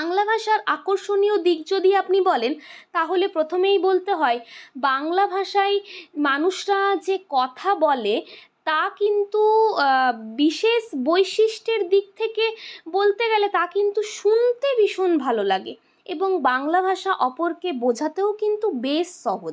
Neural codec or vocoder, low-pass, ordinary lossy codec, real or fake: none; none; none; real